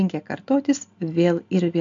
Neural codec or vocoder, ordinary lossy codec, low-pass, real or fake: none; MP3, 64 kbps; 7.2 kHz; real